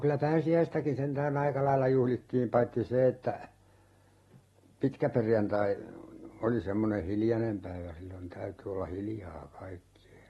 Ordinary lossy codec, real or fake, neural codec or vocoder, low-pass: AAC, 32 kbps; real; none; 19.8 kHz